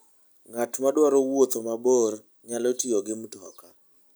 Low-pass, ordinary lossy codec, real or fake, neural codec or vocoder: none; none; real; none